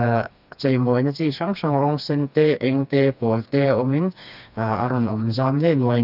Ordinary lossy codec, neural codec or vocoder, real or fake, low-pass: none; codec, 16 kHz, 2 kbps, FreqCodec, smaller model; fake; 5.4 kHz